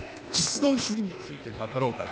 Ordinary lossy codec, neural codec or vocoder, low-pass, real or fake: none; codec, 16 kHz, 0.8 kbps, ZipCodec; none; fake